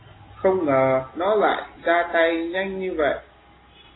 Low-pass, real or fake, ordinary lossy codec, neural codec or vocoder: 7.2 kHz; real; AAC, 16 kbps; none